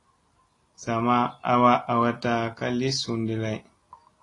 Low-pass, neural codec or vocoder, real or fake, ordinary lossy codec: 10.8 kHz; none; real; AAC, 32 kbps